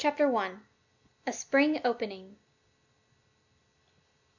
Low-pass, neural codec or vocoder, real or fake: 7.2 kHz; none; real